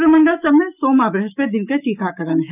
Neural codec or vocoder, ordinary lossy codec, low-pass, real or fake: autoencoder, 48 kHz, 128 numbers a frame, DAC-VAE, trained on Japanese speech; none; 3.6 kHz; fake